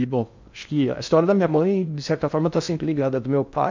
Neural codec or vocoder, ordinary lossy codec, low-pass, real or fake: codec, 16 kHz in and 24 kHz out, 0.6 kbps, FocalCodec, streaming, 2048 codes; MP3, 64 kbps; 7.2 kHz; fake